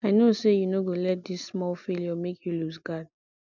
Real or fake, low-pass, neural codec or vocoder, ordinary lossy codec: real; 7.2 kHz; none; none